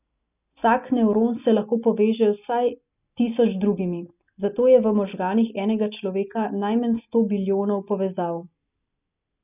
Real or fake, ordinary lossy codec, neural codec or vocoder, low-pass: real; none; none; 3.6 kHz